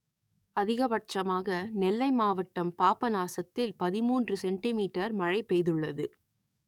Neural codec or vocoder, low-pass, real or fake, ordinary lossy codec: codec, 44.1 kHz, 7.8 kbps, DAC; 19.8 kHz; fake; none